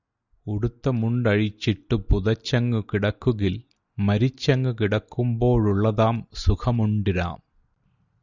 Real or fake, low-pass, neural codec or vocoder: real; 7.2 kHz; none